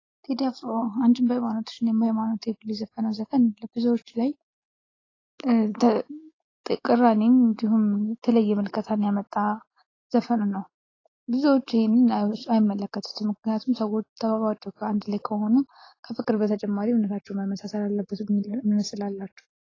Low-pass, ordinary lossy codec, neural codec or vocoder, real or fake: 7.2 kHz; AAC, 32 kbps; none; real